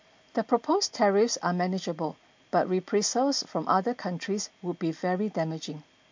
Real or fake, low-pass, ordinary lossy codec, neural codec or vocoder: real; 7.2 kHz; MP3, 48 kbps; none